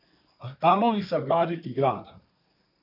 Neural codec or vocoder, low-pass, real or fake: codec, 24 kHz, 1 kbps, SNAC; 5.4 kHz; fake